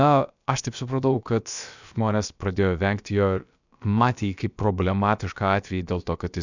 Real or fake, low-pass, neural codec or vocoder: fake; 7.2 kHz; codec, 16 kHz, about 1 kbps, DyCAST, with the encoder's durations